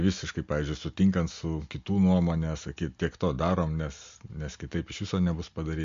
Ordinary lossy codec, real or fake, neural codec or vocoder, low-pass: MP3, 48 kbps; real; none; 7.2 kHz